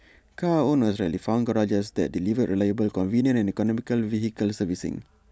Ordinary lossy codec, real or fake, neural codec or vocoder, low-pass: none; real; none; none